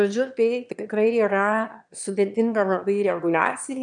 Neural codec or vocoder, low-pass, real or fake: autoencoder, 22.05 kHz, a latent of 192 numbers a frame, VITS, trained on one speaker; 9.9 kHz; fake